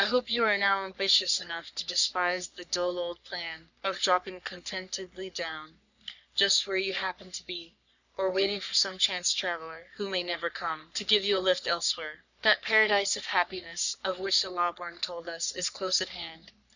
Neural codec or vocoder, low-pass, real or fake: codec, 44.1 kHz, 3.4 kbps, Pupu-Codec; 7.2 kHz; fake